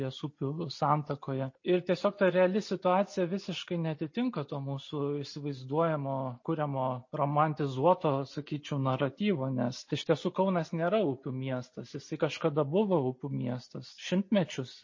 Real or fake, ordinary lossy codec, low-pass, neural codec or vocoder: real; MP3, 32 kbps; 7.2 kHz; none